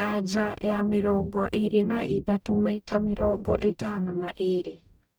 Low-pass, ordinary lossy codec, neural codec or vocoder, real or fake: none; none; codec, 44.1 kHz, 0.9 kbps, DAC; fake